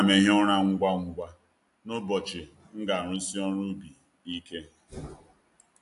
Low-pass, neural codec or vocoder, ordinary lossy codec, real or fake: 10.8 kHz; none; AAC, 64 kbps; real